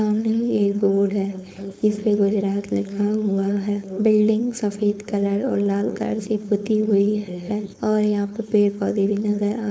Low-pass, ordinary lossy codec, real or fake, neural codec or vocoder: none; none; fake; codec, 16 kHz, 4.8 kbps, FACodec